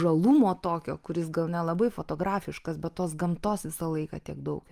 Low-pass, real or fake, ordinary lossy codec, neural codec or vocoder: 14.4 kHz; real; Opus, 32 kbps; none